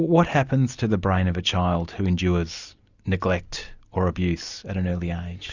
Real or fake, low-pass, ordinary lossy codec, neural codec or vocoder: real; 7.2 kHz; Opus, 64 kbps; none